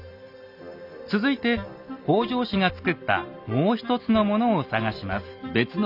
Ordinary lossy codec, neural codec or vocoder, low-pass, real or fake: none; none; 5.4 kHz; real